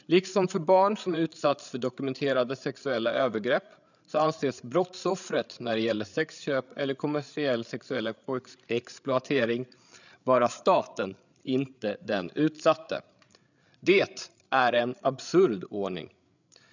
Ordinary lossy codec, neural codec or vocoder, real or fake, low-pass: none; codec, 16 kHz, 8 kbps, FreqCodec, larger model; fake; 7.2 kHz